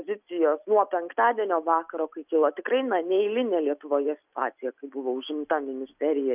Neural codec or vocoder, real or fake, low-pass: none; real; 3.6 kHz